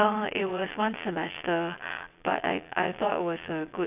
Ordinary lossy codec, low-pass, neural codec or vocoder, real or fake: none; 3.6 kHz; vocoder, 22.05 kHz, 80 mel bands, Vocos; fake